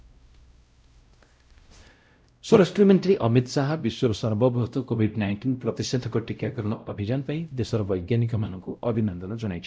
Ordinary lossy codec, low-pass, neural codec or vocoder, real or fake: none; none; codec, 16 kHz, 0.5 kbps, X-Codec, WavLM features, trained on Multilingual LibriSpeech; fake